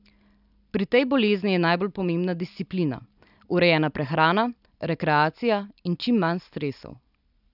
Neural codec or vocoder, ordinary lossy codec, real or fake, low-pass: none; none; real; 5.4 kHz